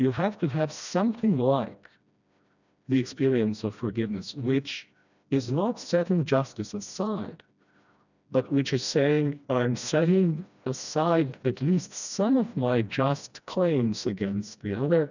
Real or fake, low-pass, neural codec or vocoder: fake; 7.2 kHz; codec, 16 kHz, 1 kbps, FreqCodec, smaller model